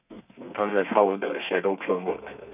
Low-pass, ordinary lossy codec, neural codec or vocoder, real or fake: 3.6 kHz; none; codec, 32 kHz, 1.9 kbps, SNAC; fake